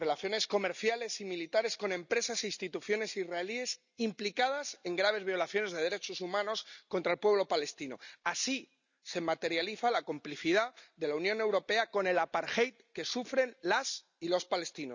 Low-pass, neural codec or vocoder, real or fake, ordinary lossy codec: 7.2 kHz; none; real; none